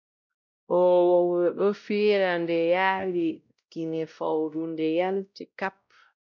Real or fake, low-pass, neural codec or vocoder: fake; 7.2 kHz; codec, 16 kHz, 0.5 kbps, X-Codec, WavLM features, trained on Multilingual LibriSpeech